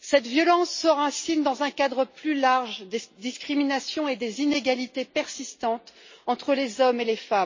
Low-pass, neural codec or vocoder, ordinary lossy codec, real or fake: 7.2 kHz; none; MP3, 32 kbps; real